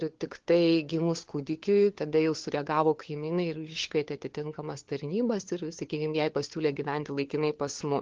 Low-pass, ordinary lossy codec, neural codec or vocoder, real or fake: 7.2 kHz; Opus, 16 kbps; codec, 16 kHz, 4 kbps, FunCodec, trained on LibriTTS, 50 frames a second; fake